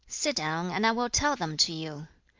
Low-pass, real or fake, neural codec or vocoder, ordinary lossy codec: 7.2 kHz; real; none; Opus, 32 kbps